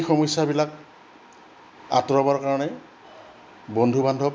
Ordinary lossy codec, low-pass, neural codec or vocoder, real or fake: none; none; none; real